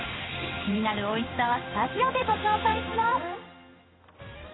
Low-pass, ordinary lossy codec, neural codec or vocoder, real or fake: 7.2 kHz; AAC, 16 kbps; codec, 16 kHz in and 24 kHz out, 1 kbps, XY-Tokenizer; fake